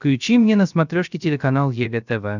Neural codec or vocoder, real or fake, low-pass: codec, 16 kHz, 0.3 kbps, FocalCodec; fake; 7.2 kHz